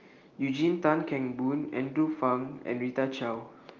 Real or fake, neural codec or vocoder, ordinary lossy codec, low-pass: real; none; Opus, 24 kbps; 7.2 kHz